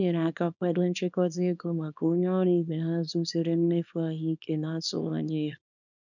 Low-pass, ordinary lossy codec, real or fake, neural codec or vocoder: 7.2 kHz; none; fake; codec, 24 kHz, 0.9 kbps, WavTokenizer, small release